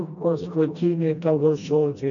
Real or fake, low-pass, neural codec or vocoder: fake; 7.2 kHz; codec, 16 kHz, 1 kbps, FreqCodec, smaller model